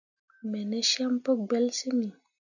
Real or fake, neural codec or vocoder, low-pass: real; none; 7.2 kHz